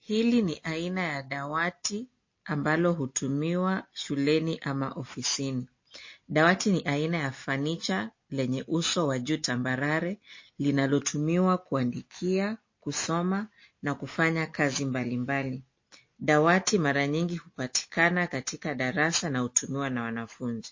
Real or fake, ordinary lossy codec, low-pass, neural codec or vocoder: real; MP3, 32 kbps; 7.2 kHz; none